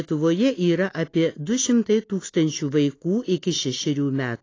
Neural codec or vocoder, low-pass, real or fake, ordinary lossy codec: none; 7.2 kHz; real; AAC, 32 kbps